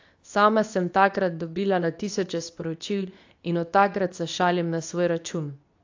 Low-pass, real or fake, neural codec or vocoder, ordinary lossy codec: 7.2 kHz; fake; codec, 24 kHz, 0.9 kbps, WavTokenizer, medium speech release version 2; AAC, 48 kbps